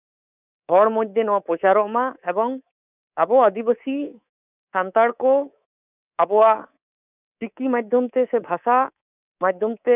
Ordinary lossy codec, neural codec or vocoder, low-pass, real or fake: none; codec, 24 kHz, 3.1 kbps, DualCodec; 3.6 kHz; fake